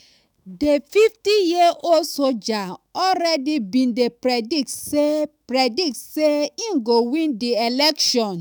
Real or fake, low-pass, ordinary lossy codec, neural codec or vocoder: fake; none; none; autoencoder, 48 kHz, 128 numbers a frame, DAC-VAE, trained on Japanese speech